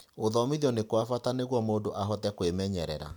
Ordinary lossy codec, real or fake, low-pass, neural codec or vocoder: none; real; none; none